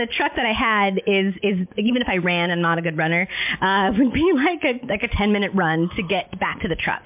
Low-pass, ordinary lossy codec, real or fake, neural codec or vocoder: 3.6 kHz; MP3, 32 kbps; real; none